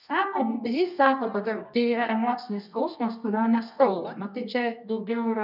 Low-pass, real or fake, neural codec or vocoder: 5.4 kHz; fake; codec, 24 kHz, 0.9 kbps, WavTokenizer, medium music audio release